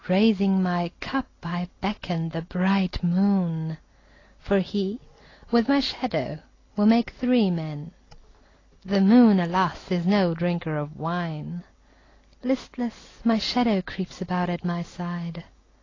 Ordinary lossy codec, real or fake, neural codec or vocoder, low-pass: AAC, 32 kbps; real; none; 7.2 kHz